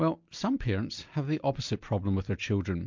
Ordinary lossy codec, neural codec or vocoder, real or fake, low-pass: MP3, 64 kbps; none; real; 7.2 kHz